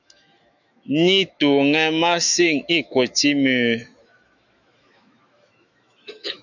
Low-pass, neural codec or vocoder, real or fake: 7.2 kHz; autoencoder, 48 kHz, 128 numbers a frame, DAC-VAE, trained on Japanese speech; fake